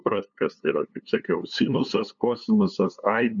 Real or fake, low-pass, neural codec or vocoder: fake; 7.2 kHz; codec, 16 kHz, 2 kbps, FunCodec, trained on LibriTTS, 25 frames a second